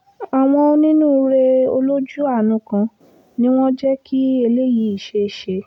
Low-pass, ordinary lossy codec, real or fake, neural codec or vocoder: 19.8 kHz; none; real; none